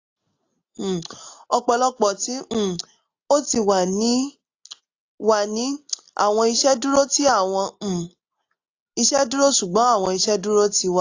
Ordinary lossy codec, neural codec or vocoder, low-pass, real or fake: AAC, 48 kbps; none; 7.2 kHz; real